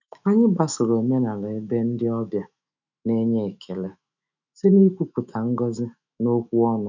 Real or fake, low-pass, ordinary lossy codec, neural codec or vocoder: fake; 7.2 kHz; none; autoencoder, 48 kHz, 128 numbers a frame, DAC-VAE, trained on Japanese speech